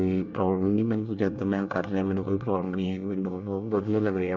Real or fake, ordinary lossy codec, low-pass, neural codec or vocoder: fake; none; 7.2 kHz; codec, 24 kHz, 1 kbps, SNAC